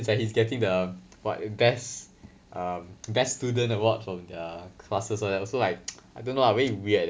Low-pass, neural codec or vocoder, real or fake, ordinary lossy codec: none; none; real; none